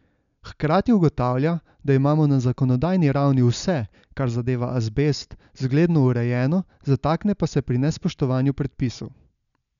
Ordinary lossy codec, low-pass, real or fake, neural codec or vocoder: none; 7.2 kHz; real; none